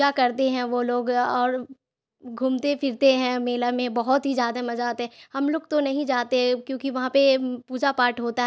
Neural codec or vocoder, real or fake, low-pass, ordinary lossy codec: none; real; none; none